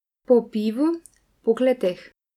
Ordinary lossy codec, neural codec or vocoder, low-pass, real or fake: none; none; 19.8 kHz; real